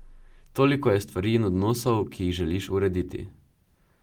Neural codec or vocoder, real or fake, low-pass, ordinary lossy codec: none; real; 19.8 kHz; Opus, 24 kbps